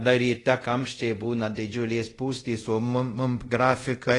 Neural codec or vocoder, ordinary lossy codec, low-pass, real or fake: codec, 24 kHz, 0.5 kbps, DualCodec; AAC, 32 kbps; 10.8 kHz; fake